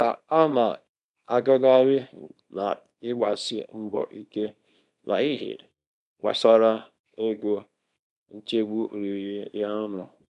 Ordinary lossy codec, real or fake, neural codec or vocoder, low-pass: none; fake; codec, 24 kHz, 0.9 kbps, WavTokenizer, small release; 10.8 kHz